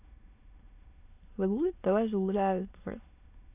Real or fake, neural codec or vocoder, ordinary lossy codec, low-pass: fake; autoencoder, 22.05 kHz, a latent of 192 numbers a frame, VITS, trained on many speakers; AAC, 32 kbps; 3.6 kHz